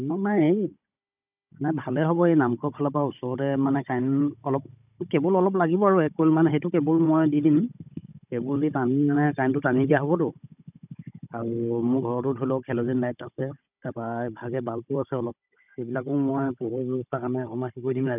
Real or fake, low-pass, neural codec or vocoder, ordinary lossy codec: fake; 3.6 kHz; codec, 16 kHz, 16 kbps, FunCodec, trained on Chinese and English, 50 frames a second; none